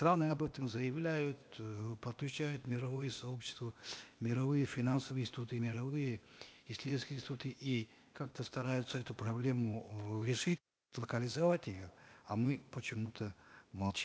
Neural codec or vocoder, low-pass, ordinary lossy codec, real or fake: codec, 16 kHz, 0.8 kbps, ZipCodec; none; none; fake